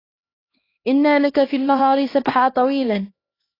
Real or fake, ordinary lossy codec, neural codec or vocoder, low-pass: fake; AAC, 24 kbps; codec, 16 kHz, 2 kbps, X-Codec, HuBERT features, trained on LibriSpeech; 5.4 kHz